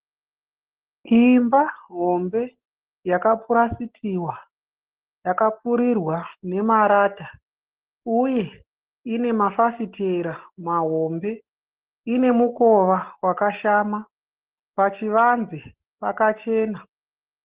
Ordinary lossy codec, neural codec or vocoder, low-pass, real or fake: Opus, 16 kbps; none; 3.6 kHz; real